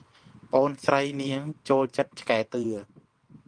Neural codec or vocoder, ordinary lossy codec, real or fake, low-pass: vocoder, 22.05 kHz, 80 mel bands, WaveNeXt; Opus, 32 kbps; fake; 9.9 kHz